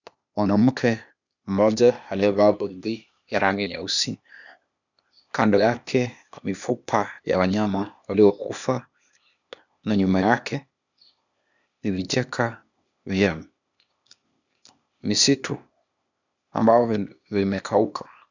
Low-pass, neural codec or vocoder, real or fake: 7.2 kHz; codec, 16 kHz, 0.8 kbps, ZipCodec; fake